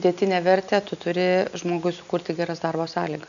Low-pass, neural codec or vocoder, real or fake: 7.2 kHz; none; real